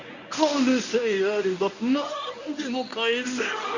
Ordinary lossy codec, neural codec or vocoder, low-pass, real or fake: none; codec, 24 kHz, 0.9 kbps, WavTokenizer, medium speech release version 1; 7.2 kHz; fake